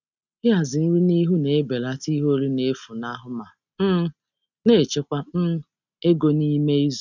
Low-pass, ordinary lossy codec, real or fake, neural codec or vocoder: 7.2 kHz; none; real; none